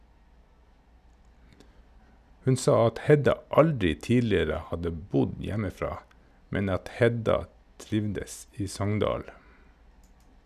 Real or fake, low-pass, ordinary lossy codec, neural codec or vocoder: real; 14.4 kHz; none; none